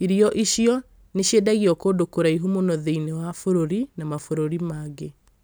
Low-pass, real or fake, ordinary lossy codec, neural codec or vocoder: none; real; none; none